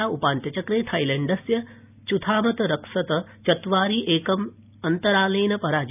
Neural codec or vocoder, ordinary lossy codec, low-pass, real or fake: none; none; 3.6 kHz; real